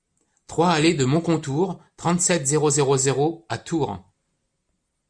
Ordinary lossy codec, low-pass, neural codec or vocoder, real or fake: MP3, 96 kbps; 9.9 kHz; none; real